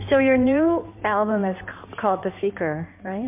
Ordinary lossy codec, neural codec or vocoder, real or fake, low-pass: MP3, 32 kbps; codec, 16 kHz in and 24 kHz out, 2.2 kbps, FireRedTTS-2 codec; fake; 3.6 kHz